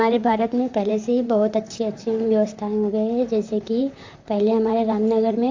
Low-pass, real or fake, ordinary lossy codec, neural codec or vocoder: 7.2 kHz; fake; AAC, 48 kbps; vocoder, 44.1 kHz, 128 mel bands, Pupu-Vocoder